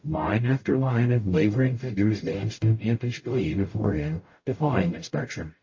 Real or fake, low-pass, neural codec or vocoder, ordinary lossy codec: fake; 7.2 kHz; codec, 44.1 kHz, 0.9 kbps, DAC; MP3, 32 kbps